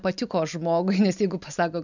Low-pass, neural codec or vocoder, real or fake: 7.2 kHz; none; real